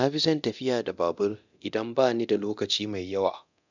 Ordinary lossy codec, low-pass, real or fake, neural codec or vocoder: none; 7.2 kHz; fake; codec, 24 kHz, 0.9 kbps, DualCodec